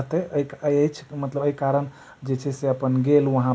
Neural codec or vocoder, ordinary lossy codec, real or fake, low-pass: none; none; real; none